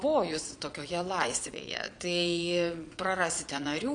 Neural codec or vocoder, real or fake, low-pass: vocoder, 22.05 kHz, 80 mel bands, Vocos; fake; 9.9 kHz